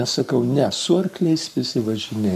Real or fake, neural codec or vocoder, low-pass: fake; codec, 44.1 kHz, 7.8 kbps, Pupu-Codec; 14.4 kHz